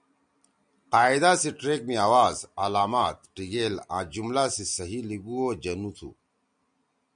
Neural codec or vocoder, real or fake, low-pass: none; real; 9.9 kHz